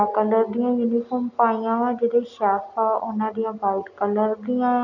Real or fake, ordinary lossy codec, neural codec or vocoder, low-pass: real; none; none; 7.2 kHz